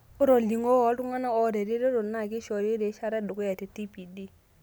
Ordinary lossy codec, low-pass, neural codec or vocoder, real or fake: none; none; none; real